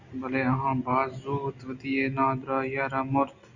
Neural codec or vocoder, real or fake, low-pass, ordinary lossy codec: none; real; 7.2 kHz; Opus, 64 kbps